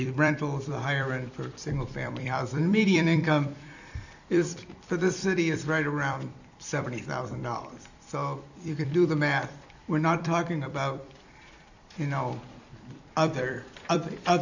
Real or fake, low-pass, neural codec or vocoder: fake; 7.2 kHz; vocoder, 44.1 kHz, 128 mel bands every 256 samples, BigVGAN v2